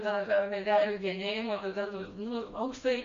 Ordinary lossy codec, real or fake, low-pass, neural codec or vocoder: AAC, 48 kbps; fake; 7.2 kHz; codec, 16 kHz, 1 kbps, FreqCodec, smaller model